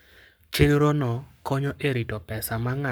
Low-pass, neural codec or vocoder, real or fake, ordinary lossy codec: none; codec, 44.1 kHz, 7.8 kbps, Pupu-Codec; fake; none